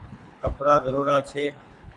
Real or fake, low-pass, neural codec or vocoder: fake; 10.8 kHz; codec, 24 kHz, 3 kbps, HILCodec